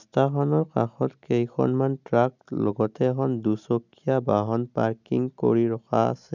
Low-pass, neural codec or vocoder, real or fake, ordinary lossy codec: 7.2 kHz; none; real; none